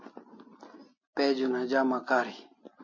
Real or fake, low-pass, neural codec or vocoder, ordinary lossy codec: real; 7.2 kHz; none; MP3, 32 kbps